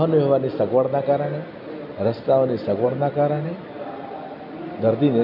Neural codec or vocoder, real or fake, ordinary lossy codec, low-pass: none; real; none; 5.4 kHz